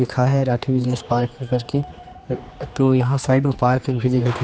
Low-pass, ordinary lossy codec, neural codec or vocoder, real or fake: none; none; codec, 16 kHz, 2 kbps, X-Codec, HuBERT features, trained on general audio; fake